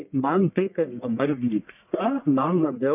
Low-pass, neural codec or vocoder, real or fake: 3.6 kHz; codec, 44.1 kHz, 1.7 kbps, Pupu-Codec; fake